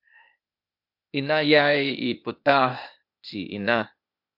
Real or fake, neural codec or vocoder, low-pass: fake; codec, 16 kHz, 0.8 kbps, ZipCodec; 5.4 kHz